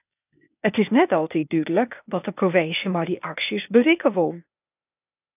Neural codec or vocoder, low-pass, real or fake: codec, 16 kHz, 0.8 kbps, ZipCodec; 3.6 kHz; fake